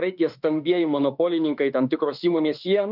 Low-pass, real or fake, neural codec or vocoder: 5.4 kHz; fake; autoencoder, 48 kHz, 32 numbers a frame, DAC-VAE, trained on Japanese speech